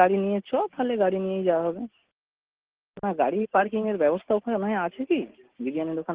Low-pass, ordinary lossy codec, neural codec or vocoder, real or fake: 3.6 kHz; Opus, 16 kbps; none; real